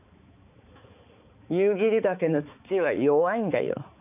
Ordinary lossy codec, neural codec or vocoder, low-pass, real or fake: none; codec, 16 kHz, 4 kbps, X-Codec, HuBERT features, trained on balanced general audio; 3.6 kHz; fake